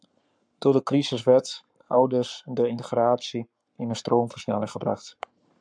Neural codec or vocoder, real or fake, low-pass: codec, 44.1 kHz, 7.8 kbps, Pupu-Codec; fake; 9.9 kHz